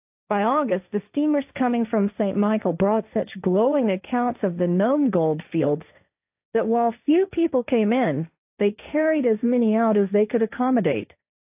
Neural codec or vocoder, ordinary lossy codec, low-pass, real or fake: codec, 16 kHz, 1.1 kbps, Voila-Tokenizer; AAC, 32 kbps; 3.6 kHz; fake